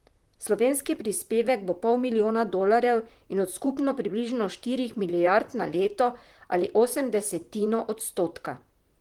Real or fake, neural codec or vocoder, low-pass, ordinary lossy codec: fake; vocoder, 44.1 kHz, 128 mel bands, Pupu-Vocoder; 19.8 kHz; Opus, 24 kbps